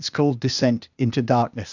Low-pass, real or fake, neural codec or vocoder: 7.2 kHz; fake; codec, 16 kHz, 0.8 kbps, ZipCodec